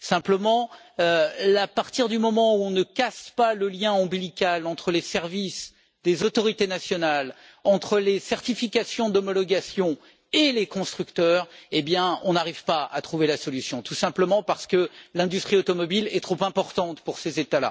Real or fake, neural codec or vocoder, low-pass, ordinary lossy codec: real; none; none; none